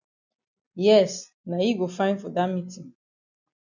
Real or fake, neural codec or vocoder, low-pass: real; none; 7.2 kHz